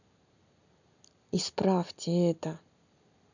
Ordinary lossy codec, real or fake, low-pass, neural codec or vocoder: none; real; 7.2 kHz; none